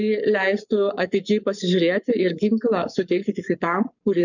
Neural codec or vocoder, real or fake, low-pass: codec, 44.1 kHz, 7.8 kbps, Pupu-Codec; fake; 7.2 kHz